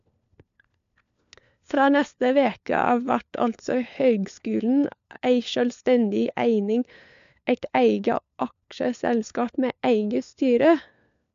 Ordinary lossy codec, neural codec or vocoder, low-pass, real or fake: MP3, 64 kbps; codec, 16 kHz, 4 kbps, FunCodec, trained on LibriTTS, 50 frames a second; 7.2 kHz; fake